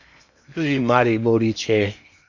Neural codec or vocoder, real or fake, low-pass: codec, 16 kHz in and 24 kHz out, 0.8 kbps, FocalCodec, streaming, 65536 codes; fake; 7.2 kHz